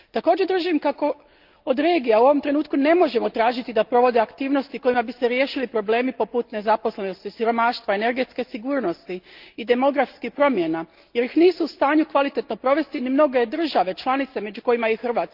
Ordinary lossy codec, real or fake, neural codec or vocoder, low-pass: Opus, 24 kbps; real; none; 5.4 kHz